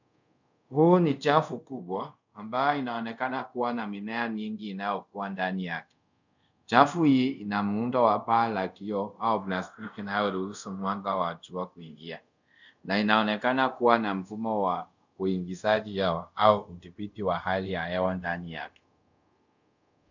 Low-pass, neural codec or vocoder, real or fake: 7.2 kHz; codec, 24 kHz, 0.5 kbps, DualCodec; fake